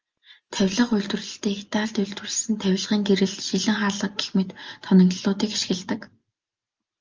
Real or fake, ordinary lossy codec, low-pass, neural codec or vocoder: real; Opus, 24 kbps; 7.2 kHz; none